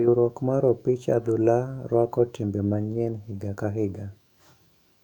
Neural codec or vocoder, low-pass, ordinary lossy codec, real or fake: autoencoder, 48 kHz, 128 numbers a frame, DAC-VAE, trained on Japanese speech; 19.8 kHz; none; fake